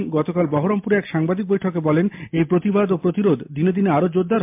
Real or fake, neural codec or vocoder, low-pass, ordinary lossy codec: real; none; 3.6 kHz; MP3, 32 kbps